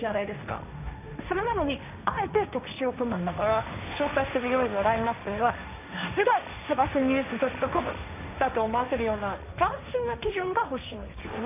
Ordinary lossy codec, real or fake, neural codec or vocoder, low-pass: none; fake; codec, 16 kHz, 1.1 kbps, Voila-Tokenizer; 3.6 kHz